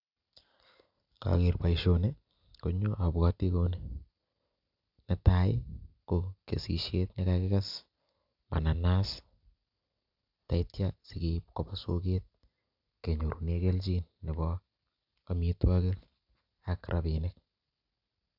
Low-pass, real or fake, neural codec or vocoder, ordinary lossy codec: 5.4 kHz; real; none; AAC, 48 kbps